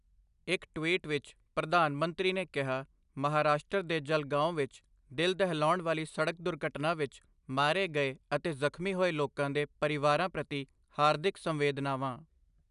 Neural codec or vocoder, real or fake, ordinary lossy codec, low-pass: none; real; none; 10.8 kHz